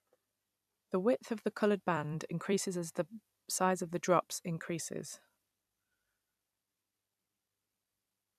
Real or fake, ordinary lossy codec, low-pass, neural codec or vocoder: fake; none; 14.4 kHz; vocoder, 44.1 kHz, 128 mel bands every 256 samples, BigVGAN v2